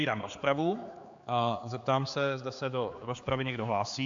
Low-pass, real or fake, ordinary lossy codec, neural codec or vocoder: 7.2 kHz; fake; AAC, 64 kbps; codec, 16 kHz, 4 kbps, X-Codec, HuBERT features, trained on general audio